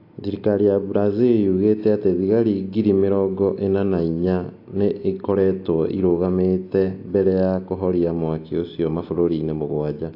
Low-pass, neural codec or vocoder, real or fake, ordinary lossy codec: 5.4 kHz; none; real; AAC, 32 kbps